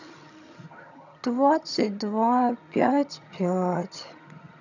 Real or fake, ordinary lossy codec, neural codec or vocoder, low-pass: fake; none; vocoder, 22.05 kHz, 80 mel bands, HiFi-GAN; 7.2 kHz